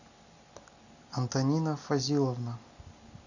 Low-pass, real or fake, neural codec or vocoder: 7.2 kHz; real; none